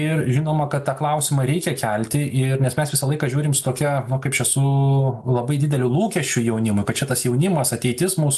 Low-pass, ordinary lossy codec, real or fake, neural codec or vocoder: 14.4 kHz; AAC, 96 kbps; real; none